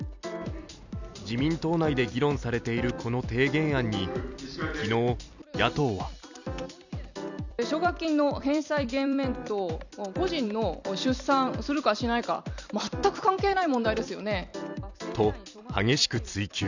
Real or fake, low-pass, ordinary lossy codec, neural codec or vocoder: fake; 7.2 kHz; none; vocoder, 44.1 kHz, 128 mel bands every 256 samples, BigVGAN v2